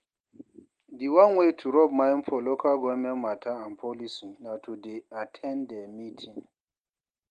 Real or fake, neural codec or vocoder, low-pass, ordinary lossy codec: real; none; 9.9 kHz; Opus, 24 kbps